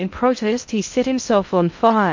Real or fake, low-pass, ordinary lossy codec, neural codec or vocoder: fake; 7.2 kHz; MP3, 48 kbps; codec, 16 kHz in and 24 kHz out, 0.6 kbps, FocalCodec, streaming, 2048 codes